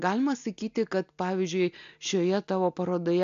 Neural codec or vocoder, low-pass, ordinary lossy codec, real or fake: none; 7.2 kHz; AAC, 64 kbps; real